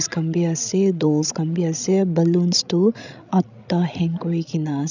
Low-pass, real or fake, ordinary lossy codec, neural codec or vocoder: 7.2 kHz; fake; none; codec, 16 kHz, 16 kbps, FreqCodec, larger model